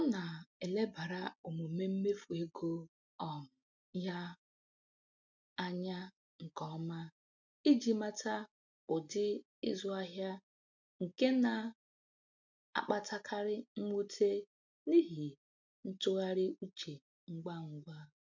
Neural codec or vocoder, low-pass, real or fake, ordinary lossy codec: none; 7.2 kHz; real; MP3, 64 kbps